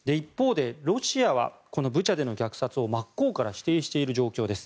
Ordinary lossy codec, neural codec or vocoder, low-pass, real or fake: none; none; none; real